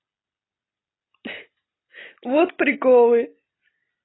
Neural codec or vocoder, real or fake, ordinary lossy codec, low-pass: none; real; AAC, 16 kbps; 7.2 kHz